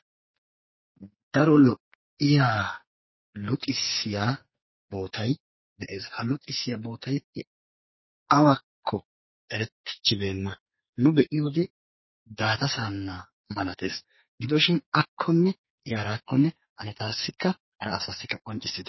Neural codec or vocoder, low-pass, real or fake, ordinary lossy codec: codec, 44.1 kHz, 2.6 kbps, SNAC; 7.2 kHz; fake; MP3, 24 kbps